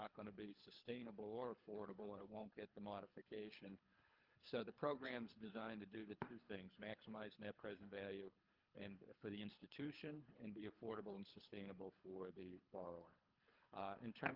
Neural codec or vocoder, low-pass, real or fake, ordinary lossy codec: codec, 24 kHz, 3 kbps, HILCodec; 5.4 kHz; fake; Opus, 24 kbps